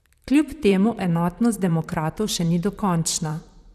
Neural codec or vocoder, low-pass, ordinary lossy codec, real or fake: vocoder, 44.1 kHz, 128 mel bands, Pupu-Vocoder; 14.4 kHz; none; fake